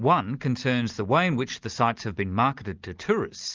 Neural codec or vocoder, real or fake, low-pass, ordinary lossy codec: none; real; 7.2 kHz; Opus, 32 kbps